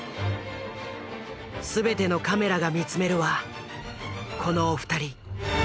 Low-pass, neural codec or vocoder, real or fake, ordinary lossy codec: none; none; real; none